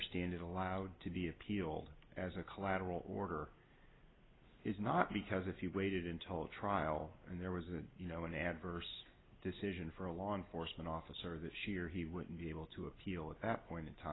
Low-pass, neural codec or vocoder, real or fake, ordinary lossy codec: 7.2 kHz; none; real; AAC, 16 kbps